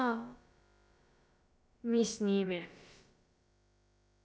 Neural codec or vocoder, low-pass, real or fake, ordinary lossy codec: codec, 16 kHz, about 1 kbps, DyCAST, with the encoder's durations; none; fake; none